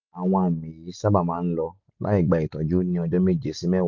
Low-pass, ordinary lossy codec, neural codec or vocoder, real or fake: 7.2 kHz; none; none; real